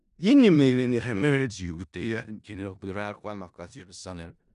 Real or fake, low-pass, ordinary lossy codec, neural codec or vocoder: fake; 10.8 kHz; none; codec, 16 kHz in and 24 kHz out, 0.4 kbps, LongCat-Audio-Codec, four codebook decoder